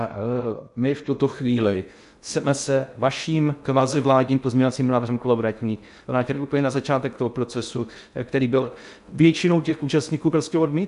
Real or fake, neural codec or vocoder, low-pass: fake; codec, 16 kHz in and 24 kHz out, 0.6 kbps, FocalCodec, streaming, 2048 codes; 10.8 kHz